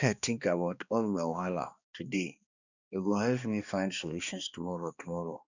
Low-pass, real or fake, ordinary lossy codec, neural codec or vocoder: 7.2 kHz; fake; none; codec, 16 kHz, 2 kbps, X-Codec, HuBERT features, trained on balanced general audio